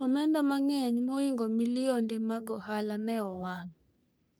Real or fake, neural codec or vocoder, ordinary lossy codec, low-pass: fake; codec, 44.1 kHz, 3.4 kbps, Pupu-Codec; none; none